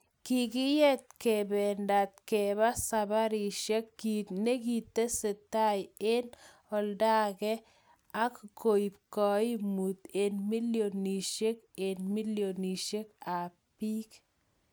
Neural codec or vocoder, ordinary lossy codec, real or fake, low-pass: none; none; real; none